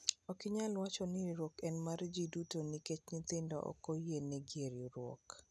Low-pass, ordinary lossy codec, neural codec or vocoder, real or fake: none; none; none; real